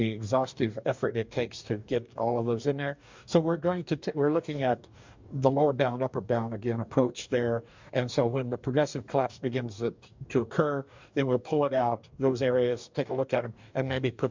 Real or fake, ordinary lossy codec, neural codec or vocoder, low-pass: fake; MP3, 64 kbps; codec, 44.1 kHz, 2.6 kbps, DAC; 7.2 kHz